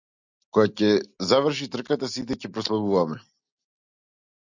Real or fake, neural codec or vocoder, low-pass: real; none; 7.2 kHz